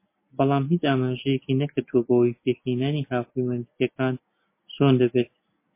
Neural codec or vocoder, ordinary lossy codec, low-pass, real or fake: none; MP3, 24 kbps; 3.6 kHz; real